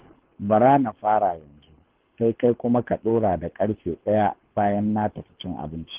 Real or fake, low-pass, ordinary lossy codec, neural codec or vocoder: fake; 3.6 kHz; Opus, 16 kbps; codec, 24 kHz, 6 kbps, HILCodec